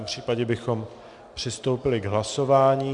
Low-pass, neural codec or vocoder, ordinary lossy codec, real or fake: 10.8 kHz; none; MP3, 96 kbps; real